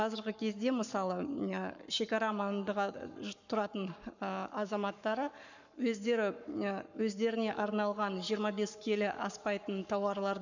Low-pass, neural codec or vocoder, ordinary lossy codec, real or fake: 7.2 kHz; codec, 44.1 kHz, 7.8 kbps, Pupu-Codec; none; fake